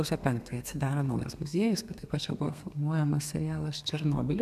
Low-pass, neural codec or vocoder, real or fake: 14.4 kHz; codec, 32 kHz, 1.9 kbps, SNAC; fake